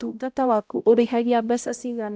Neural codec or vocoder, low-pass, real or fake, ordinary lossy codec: codec, 16 kHz, 0.5 kbps, X-Codec, HuBERT features, trained on balanced general audio; none; fake; none